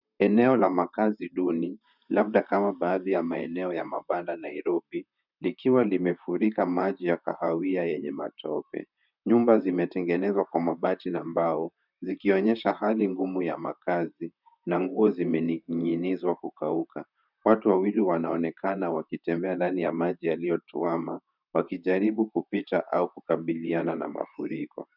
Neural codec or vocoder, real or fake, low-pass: vocoder, 44.1 kHz, 128 mel bands, Pupu-Vocoder; fake; 5.4 kHz